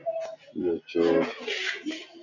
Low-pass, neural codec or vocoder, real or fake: 7.2 kHz; none; real